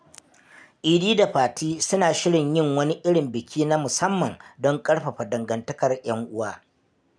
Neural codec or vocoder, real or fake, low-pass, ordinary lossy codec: none; real; 9.9 kHz; none